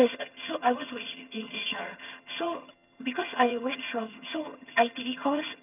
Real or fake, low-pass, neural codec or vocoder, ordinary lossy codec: fake; 3.6 kHz; vocoder, 22.05 kHz, 80 mel bands, HiFi-GAN; none